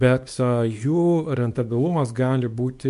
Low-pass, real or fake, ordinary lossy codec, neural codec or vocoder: 10.8 kHz; fake; MP3, 64 kbps; codec, 24 kHz, 0.9 kbps, WavTokenizer, small release